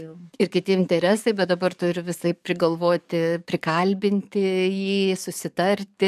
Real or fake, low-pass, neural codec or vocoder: fake; 14.4 kHz; codec, 44.1 kHz, 7.8 kbps, DAC